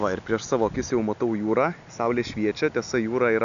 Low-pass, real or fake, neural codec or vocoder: 7.2 kHz; real; none